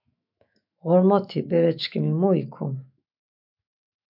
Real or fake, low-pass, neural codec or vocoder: fake; 5.4 kHz; autoencoder, 48 kHz, 128 numbers a frame, DAC-VAE, trained on Japanese speech